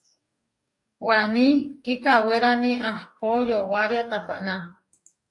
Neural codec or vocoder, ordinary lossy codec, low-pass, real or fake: codec, 44.1 kHz, 2.6 kbps, DAC; AAC, 64 kbps; 10.8 kHz; fake